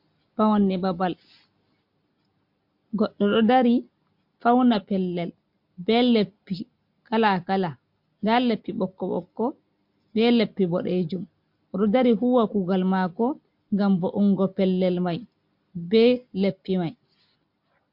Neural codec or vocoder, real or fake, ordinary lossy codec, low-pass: none; real; MP3, 48 kbps; 5.4 kHz